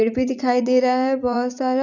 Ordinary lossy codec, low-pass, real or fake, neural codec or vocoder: none; 7.2 kHz; fake; vocoder, 44.1 kHz, 80 mel bands, Vocos